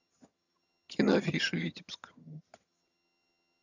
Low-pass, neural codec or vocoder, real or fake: 7.2 kHz; vocoder, 22.05 kHz, 80 mel bands, HiFi-GAN; fake